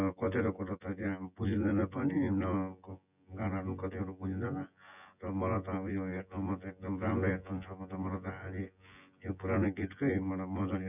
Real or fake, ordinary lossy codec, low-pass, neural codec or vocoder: fake; none; 3.6 kHz; vocoder, 24 kHz, 100 mel bands, Vocos